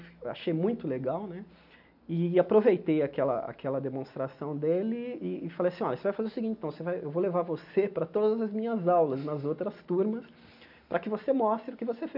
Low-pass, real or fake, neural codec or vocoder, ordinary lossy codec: 5.4 kHz; real; none; none